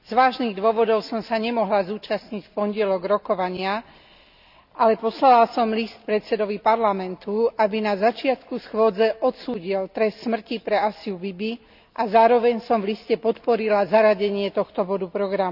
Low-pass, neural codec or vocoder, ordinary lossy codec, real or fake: 5.4 kHz; none; none; real